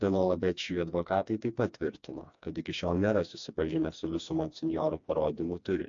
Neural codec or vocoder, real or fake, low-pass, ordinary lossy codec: codec, 16 kHz, 2 kbps, FreqCodec, smaller model; fake; 7.2 kHz; AAC, 64 kbps